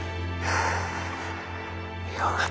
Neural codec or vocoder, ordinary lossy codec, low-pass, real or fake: none; none; none; real